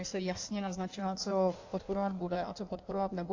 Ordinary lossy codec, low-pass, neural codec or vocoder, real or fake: AAC, 48 kbps; 7.2 kHz; codec, 16 kHz in and 24 kHz out, 1.1 kbps, FireRedTTS-2 codec; fake